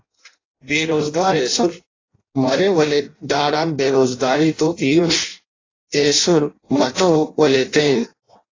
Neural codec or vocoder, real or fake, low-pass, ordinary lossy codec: codec, 16 kHz in and 24 kHz out, 0.6 kbps, FireRedTTS-2 codec; fake; 7.2 kHz; AAC, 32 kbps